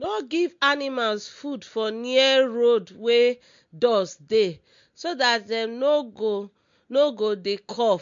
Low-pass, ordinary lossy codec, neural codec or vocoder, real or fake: 7.2 kHz; MP3, 48 kbps; none; real